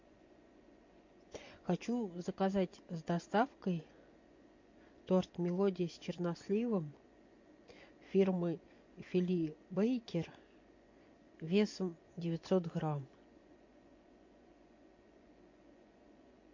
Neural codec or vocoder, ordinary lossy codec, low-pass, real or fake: vocoder, 24 kHz, 100 mel bands, Vocos; MP3, 48 kbps; 7.2 kHz; fake